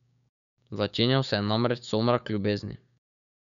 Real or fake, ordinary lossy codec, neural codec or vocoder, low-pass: fake; none; codec, 16 kHz, 6 kbps, DAC; 7.2 kHz